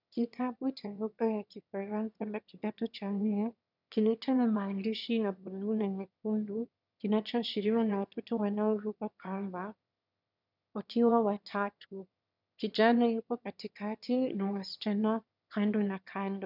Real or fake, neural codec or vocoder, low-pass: fake; autoencoder, 22.05 kHz, a latent of 192 numbers a frame, VITS, trained on one speaker; 5.4 kHz